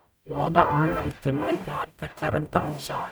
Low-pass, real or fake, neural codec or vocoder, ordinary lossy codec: none; fake; codec, 44.1 kHz, 0.9 kbps, DAC; none